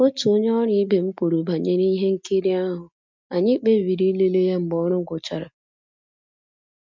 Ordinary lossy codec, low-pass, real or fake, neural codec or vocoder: MP3, 64 kbps; 7.2 kHz; real; none